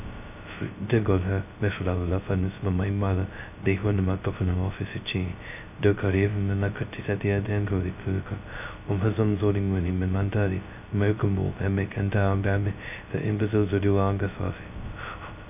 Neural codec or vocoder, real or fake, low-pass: codec, 16 kHz, 0.2 kbps, FocalCodec; fake; 3.6 kHz